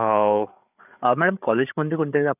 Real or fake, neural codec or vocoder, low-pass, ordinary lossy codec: fake; codec, 16 kHz, 8 kbps, FreqCodec, larger model; 3.6 kHz; none